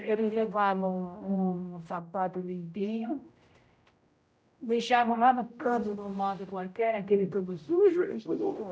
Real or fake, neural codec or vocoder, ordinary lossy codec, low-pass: fake; codec, 16 kHz, 0.5 kbps, X-Codec, HuBERT features, trained on general audio; none; none